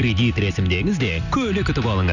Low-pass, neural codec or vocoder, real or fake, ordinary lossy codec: 7.2 kHz; none; real; Opus, 64 kbps